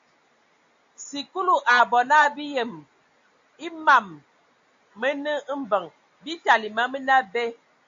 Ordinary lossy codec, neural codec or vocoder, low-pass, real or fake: AAC, 64 kbps; none; 7.2 kHz; real